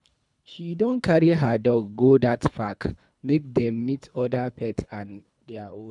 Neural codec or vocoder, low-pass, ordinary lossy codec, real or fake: codec, 24 kHz, 3 kbps, HILCodec; 10.8 kHz; none; fake